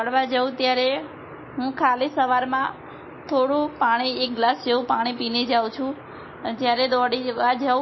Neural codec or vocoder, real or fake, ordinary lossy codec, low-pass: none; real; MP3, 24 kbps; 7.2 kHz